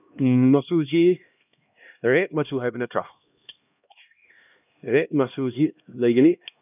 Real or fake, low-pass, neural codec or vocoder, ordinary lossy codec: fake; 3.6 kHz; codec, 16 kHz, 1 kbps, X-Codec, HuBERT features, trained on LibriSpeech; none